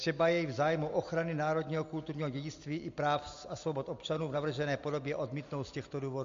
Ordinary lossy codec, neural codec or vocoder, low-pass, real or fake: MP3, 48 kbps; none; 7.2 kHz; real